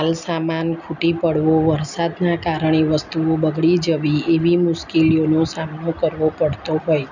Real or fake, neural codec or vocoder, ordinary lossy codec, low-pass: real; none; none; 7.2 kHz